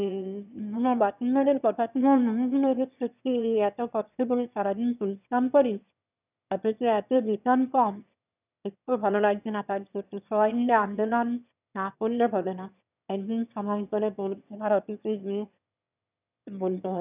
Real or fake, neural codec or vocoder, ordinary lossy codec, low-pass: fake; autoencoder, 22.05 kHz, a latent of 192 numbers a frame, VITS, trained on one speaker; none; 3.6 kHz